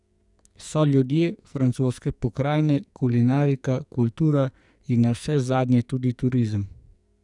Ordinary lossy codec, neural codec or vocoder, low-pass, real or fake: none; codec, 44.1 kHz, 2.6 kbps, SNAC; 10.8 kHz; fake